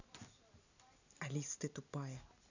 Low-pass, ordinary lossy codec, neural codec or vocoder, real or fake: 7.2 kHz; none; none; real